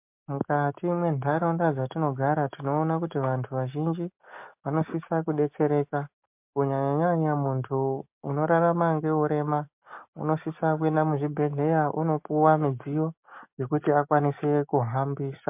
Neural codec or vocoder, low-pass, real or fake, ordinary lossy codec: none; 3.6 kHz; real; MP3, 32 kbps